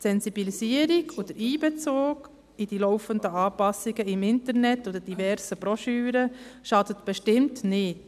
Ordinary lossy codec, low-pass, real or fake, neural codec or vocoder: none; 14.4 kHz; real; none